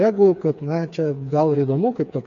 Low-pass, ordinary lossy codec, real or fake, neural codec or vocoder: 7.2 kHz; MP3, 64 kbps; fake; codec, 16 kHz, 4 kbps, FreqCodec, smaller model